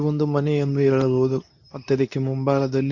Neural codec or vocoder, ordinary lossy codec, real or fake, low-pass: codec, 24 kHz, 0.9 kbps, WavTokenizer, medium speech release version 2; none; fake; 7.2 kHz